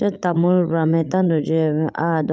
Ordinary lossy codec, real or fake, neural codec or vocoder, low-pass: none; fake; codec, 16 kHz, 16 kbps, FreqCodec, larger model; none